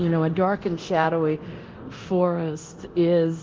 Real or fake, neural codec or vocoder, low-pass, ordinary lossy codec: fake; codec, 24 kHz, 0.9 kbps, DualCodec; 7.2 kHz; Opus, 16 kbps